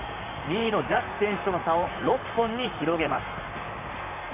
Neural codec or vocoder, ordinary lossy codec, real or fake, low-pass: vocoder, 44.1 kHz, 128 mel bands, Pupu-Vocoder; none; fake; 3.6 kHz